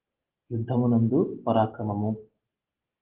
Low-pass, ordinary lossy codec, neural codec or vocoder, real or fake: 3.6 kHz; Opus, 16 kbps; none; real